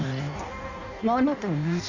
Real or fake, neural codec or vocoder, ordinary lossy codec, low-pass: fake; codec, 16 kHz in and 24 kHz out, 0.6 kbps, FireRedTTS-2 codec; none; 7.2 kHz